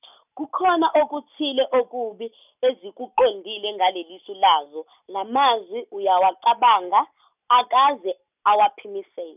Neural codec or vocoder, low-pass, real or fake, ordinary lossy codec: none; 3.6 kHz; real; none